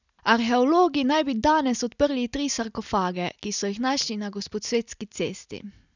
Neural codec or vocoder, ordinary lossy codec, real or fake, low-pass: none; none; real; 7.2 kHz